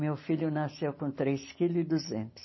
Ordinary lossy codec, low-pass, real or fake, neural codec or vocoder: MP3, 24 kbps; 7.2 kHz; real; none